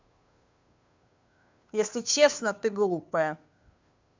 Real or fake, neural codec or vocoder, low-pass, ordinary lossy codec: fake; codec, 16 kHz, 2 kbps, FunCodec, trained on Chinese and English, 25 frames a second; 7.2 kHz; MP3, 64 kbps